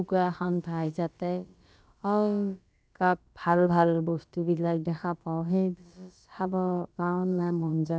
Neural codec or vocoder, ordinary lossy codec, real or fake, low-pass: codec, 16 kHz, about 1 kbps, DyCAST, with the encoder's durations; none; fake; none